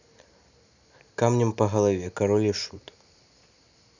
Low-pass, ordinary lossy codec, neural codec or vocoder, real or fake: 7.2 kHz; none; none; real